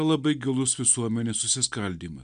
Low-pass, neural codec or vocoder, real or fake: 9.9 kHz; none; real